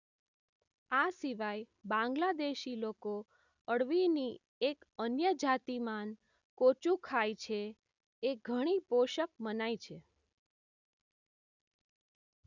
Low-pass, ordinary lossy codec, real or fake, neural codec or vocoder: 7.2 kHz; none; real; none